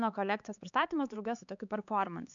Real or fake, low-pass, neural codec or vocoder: fake; 7.2 kHz; codec, 16 kHz, 4 kbps, X-Codec, HuBERT features, trained on LibriSpeech